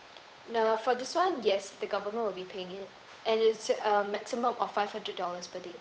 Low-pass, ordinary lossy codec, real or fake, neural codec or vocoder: none; none; fake; codec, 16 kHz, 8 kbps, FunCodec, trained on Chinese and English, 25 frames a second